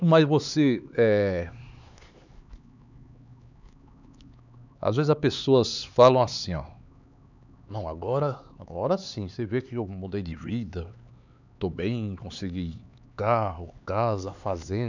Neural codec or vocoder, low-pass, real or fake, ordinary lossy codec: codec, 16 kHz, 4 kbps, X-Codec, HuBERT features, trained on LibriSpeech; 7.2 kHz; fake; none